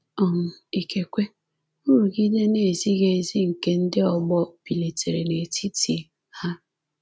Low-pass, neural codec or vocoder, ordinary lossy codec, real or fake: none; none; none; real